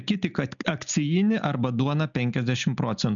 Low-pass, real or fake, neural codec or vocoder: 7.2 kHz; real; none